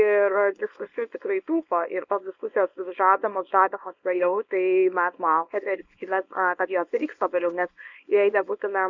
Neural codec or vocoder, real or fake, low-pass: codec, 24 kHz, 0.9 kbps, WavTokenizer, small release; fake; 7.2 kHz